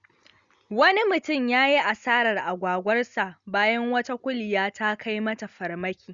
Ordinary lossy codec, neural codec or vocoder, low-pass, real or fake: Opus, 64 kbps; none; 7.2 kHz; real